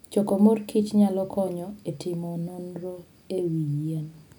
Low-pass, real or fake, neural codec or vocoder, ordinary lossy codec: none; real; none; none